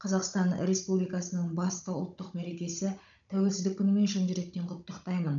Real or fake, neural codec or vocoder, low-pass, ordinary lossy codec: fake; codec, 16 kHz, 16 kbps, FunCodec, trained on Chinese and English, 50 frames a second; 7.2 kHz; none